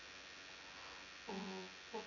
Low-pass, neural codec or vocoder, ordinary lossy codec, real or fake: 7.2 kHz; vocoder, 24 kHz, 100 mel bands, Vocos; none; fake